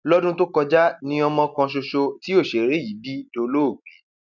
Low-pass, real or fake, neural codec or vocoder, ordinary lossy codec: 7.2 kHz; real; none; none